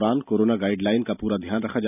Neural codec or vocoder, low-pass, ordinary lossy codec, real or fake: none; 3.6 kHz; none; real